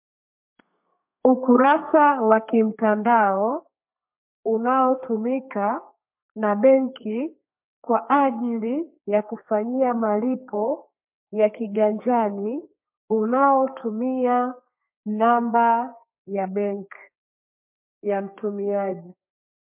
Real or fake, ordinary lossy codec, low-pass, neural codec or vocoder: fake; MP3, 32 kbps; 3.6 kHz; codec, 32 kHz, 1.9 kbps, SNAC